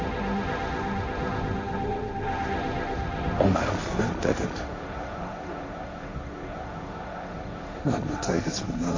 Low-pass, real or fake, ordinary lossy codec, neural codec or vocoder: 7.2 kHz; fake; MP3, 32 kbps; codec, 16 kHz, 1.1 kbps, Voila-Tokenizer